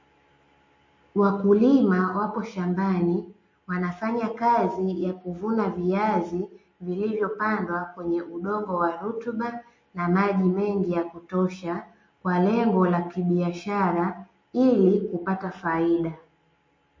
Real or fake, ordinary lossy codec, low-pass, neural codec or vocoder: real; MP3, 32 kbps; 7.2 kHz; none